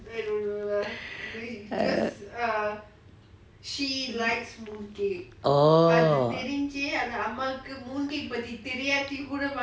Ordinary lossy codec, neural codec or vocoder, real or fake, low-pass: none; none; real; none